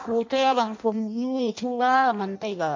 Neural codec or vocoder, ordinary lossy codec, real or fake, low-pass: codec, 16 kHz in and 24 kHz out, 0.6 kbps, FireRedTTS-2 codec; MP3, 64 kbps; fake; 7.2 kHz